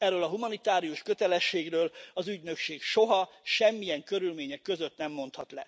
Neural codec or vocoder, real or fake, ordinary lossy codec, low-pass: none; real; none; none